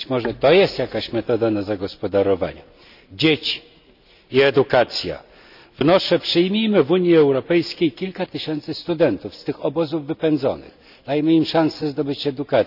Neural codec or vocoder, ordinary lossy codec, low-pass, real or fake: none; none; 5.4 kHz; real